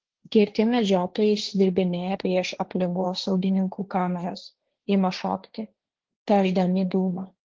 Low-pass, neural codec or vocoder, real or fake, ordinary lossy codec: 7.2 kHz; codec, 16 kHz, 1.1 kbps, Voila-Tokenizer; fake; Opus, 16 kbps